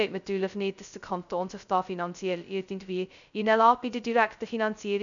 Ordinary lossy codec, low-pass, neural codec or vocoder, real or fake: none; 7.2 kHz; codec, 16 kHz, 0.2 kbps, FocalCodec; fake